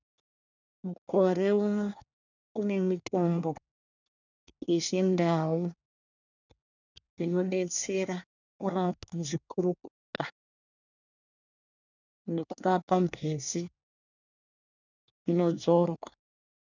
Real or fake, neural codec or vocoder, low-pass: fake; codec, 24 kHz, 1 kbps, SNAC; 7.2 kHz